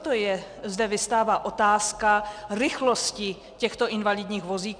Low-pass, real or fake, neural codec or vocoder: 9.9 kHz; real; none